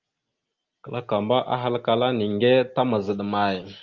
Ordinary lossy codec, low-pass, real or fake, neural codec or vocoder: Opus, 32 kbps; 7.2 kHz; real; none